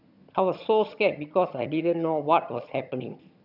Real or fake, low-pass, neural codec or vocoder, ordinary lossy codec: fake; 5.4 kHz; vocoder, 22.05 kHz, 80 mel bands, HiFi-GAN; none